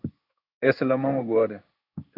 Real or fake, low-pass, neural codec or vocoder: fake; 5.4 kHz; codec, 16 kHz in and 24 kHz out, 1 kbps, XY-Tokenizer